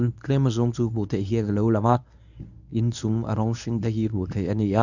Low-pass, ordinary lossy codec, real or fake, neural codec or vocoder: 7.2 kHz; none; fake; codec, 24 kHz, 0.9 kbps, WavTokenizer, medium speech release version 1